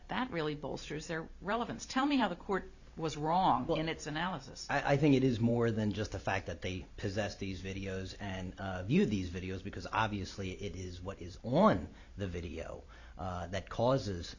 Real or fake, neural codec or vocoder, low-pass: fake; vocoder, 44.1 kHz, 128 mel bands every 512 samples, BigVGAN v2; 7.2 kHz